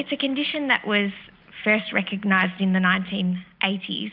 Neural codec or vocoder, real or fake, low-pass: none; real; 5.4 kHz